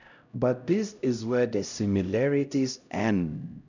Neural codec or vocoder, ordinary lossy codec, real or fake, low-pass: codec, 16 kHz, 0.5 kbps, X-Codec, HuBERT features, trained on LibriSpeech; none; fake; 7.2 kHz